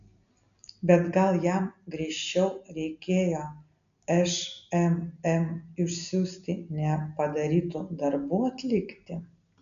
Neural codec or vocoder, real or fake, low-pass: none; real; 7.2 kHz